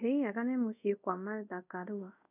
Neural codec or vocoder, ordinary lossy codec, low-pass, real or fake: codec, 24 kHz, 0.5 kbps, DualCodec; none; 3.6 kHz; fake